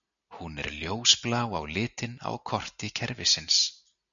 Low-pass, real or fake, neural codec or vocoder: 7.2 kHz; real; none